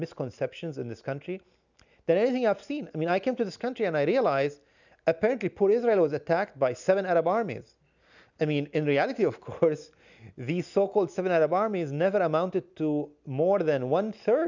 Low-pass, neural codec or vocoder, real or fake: 7.2 kHz; none; real